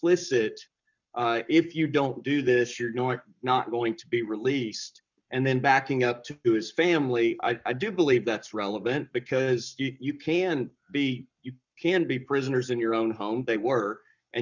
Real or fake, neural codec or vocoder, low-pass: fake; codec, 44.1 kHz, 7.8 kbps, Pupu-Codec; 7.2 kHz